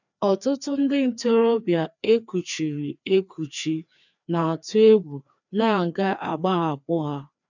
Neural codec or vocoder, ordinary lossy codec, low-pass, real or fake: codec, 16 kHz, 2 kbps, FreqCodec, larger model; none; 7.2 kHz; fake